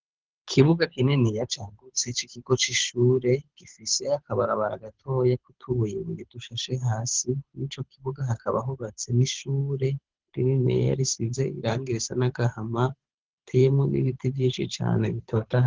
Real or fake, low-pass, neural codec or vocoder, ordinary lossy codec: fake; 7.2 kHz; codec, 24 kHz, 6 kbps, HILCodec; Opus, 16 kbps